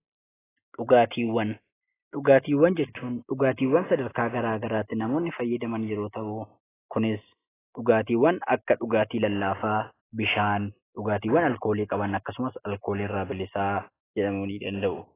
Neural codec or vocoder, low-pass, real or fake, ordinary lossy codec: none; 3.6 kHz; real; AAC, 16 kbps